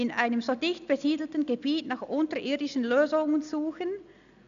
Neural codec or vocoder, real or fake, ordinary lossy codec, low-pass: none; real; none; 7.2 kHz